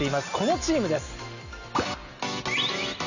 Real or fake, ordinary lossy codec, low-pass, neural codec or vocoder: real; none; 7.2 kHz; none